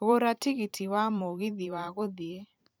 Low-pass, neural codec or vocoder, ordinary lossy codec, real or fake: none; vocoder, 44.1 kHz, 128 mel bands every 512 samples, BigVGAN v2; none; fake